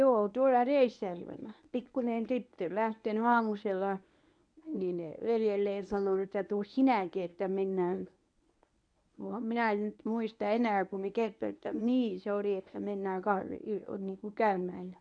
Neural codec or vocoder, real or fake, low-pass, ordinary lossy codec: codec, 24 kHz, 0.9 kbps, WavTokenizer, medium speech release version 1; fake; 9.9 kHz; none